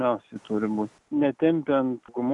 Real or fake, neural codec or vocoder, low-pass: real; none; 10.8 kHz